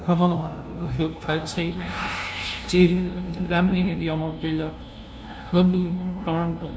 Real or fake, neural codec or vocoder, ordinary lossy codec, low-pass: fake; codec, 16 kHz, 0.5 kbps, FunCodec, trained on LibriTTS, 25 frames a second; none; none